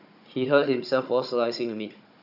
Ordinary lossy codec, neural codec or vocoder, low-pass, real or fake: none; codec, 16 kHz, 16 kbps, FunCodec, trained on Chinese and English, 50 frames a second; 5.4 kHz; fake